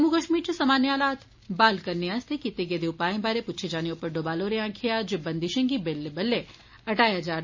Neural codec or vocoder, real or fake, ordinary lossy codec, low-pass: none; real; MP3, 32 kbps; 7.2 kHz